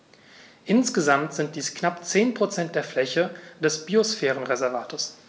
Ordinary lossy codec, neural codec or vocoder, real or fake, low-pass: none; none; real; none